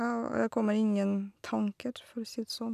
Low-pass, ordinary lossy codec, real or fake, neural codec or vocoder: 14.4 kHz; none; real; none